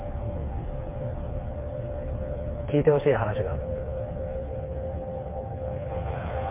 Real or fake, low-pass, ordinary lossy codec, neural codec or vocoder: fake; 3.6 kHz; MP3, 24 kbps; codec, 16 kHz, 4 kbps, FreqCodec, smaller model